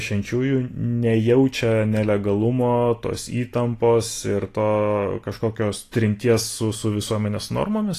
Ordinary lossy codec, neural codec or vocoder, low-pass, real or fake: AAC, 48 kbps; none; 14.4 kHz; real